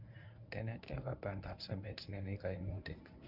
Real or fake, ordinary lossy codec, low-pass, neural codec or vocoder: fake; none; 5.4 kHz; codec, 24 kHz, 0.9 kbps, WavTokenizer, medium speech release version 1